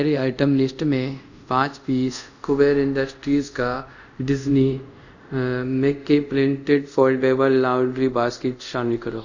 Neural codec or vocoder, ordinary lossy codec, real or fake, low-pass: codec, 24 kHz, 0.5 kbps, DualCodec; none; fake; 7.2 kHz